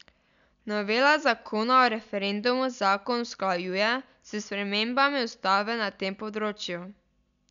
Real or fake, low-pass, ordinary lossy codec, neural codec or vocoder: real; 7.2 kHz; none; none